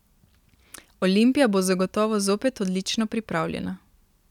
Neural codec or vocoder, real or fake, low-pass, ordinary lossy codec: none; real; 19.8 kHz; none